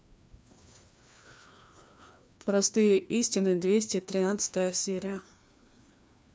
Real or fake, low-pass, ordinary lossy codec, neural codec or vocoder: fake; none; none; codec, 16 kHz, 2 kbps, FreqCodec, larger model